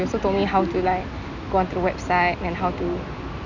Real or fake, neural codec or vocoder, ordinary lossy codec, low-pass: real; none; none; 7.2 kHz